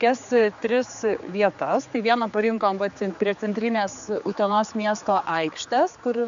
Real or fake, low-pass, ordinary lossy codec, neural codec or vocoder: fake; 7.2 kHz; AAC, 96 kbps; codec, 16 kHz, 4 kbps, X-Codec, HuBERT features, trained on general audio